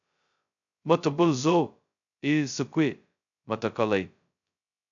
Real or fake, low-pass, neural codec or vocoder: fake; 7.2 kHz; codec, 16 kHz, 0.2 kbps, FocalCodec